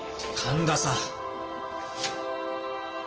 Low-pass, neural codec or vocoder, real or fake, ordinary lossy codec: 7.2 kHz; none; real; Opus, 16 kbps